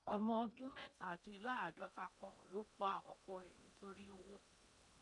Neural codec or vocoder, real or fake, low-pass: codec, 16 kHz in and 24 kHz out, 0.8 kbps, FocalCodec, streaming, 65536 codes; fake; 10.8 kHz